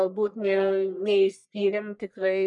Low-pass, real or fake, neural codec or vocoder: 10.8 kHz; fake; codec, 44.1 kHz, 1.7 kbps, Pupu-Codec